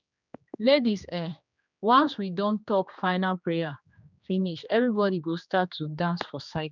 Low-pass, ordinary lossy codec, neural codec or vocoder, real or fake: 7.2 kHz; none; codec, 16 kHz, 2 kbps, X-Codec, HuBERT features, trained on general audio; fake